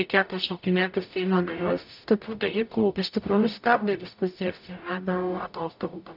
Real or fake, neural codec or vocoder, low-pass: fake; codec, 44.1 kHz, 0.9 kbps, DAC; 5.4 kHz